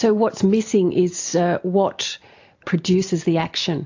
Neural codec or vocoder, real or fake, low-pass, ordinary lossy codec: none; real; 7.2 kHz; AAC, 48 kbps